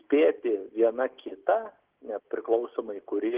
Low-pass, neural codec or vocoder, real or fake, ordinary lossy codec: 3.6 kHz; none; real; Opus, 16 kbps